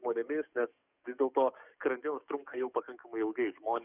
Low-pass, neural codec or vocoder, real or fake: 3.6 kHz; codec, 44.1 kHz, 7.8 kbps, DAC; fake